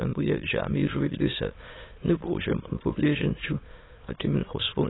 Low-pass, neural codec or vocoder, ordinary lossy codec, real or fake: 7.2 kHz; autoencoder, 22.05 kHz, a latent of 192 numbers a frame, VITS, trained on many speakers; AAC, 16 kbps; fake